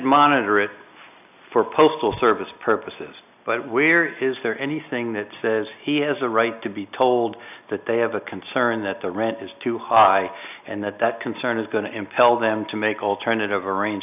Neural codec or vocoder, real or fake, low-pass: none; real; 3.6 kHz